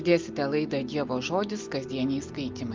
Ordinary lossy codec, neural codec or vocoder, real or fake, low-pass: Opus, 32 kbps; none; real; 7.2 kHz